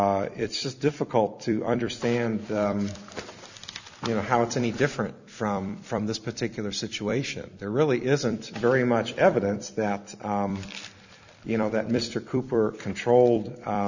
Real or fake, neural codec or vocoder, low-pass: fake; vocoder, 44.1 kHz, 128 mel bands every 256 samples, BigVGAN v2; 7.2 kHz